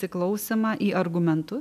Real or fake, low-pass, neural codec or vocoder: real; 14.4 kHz; none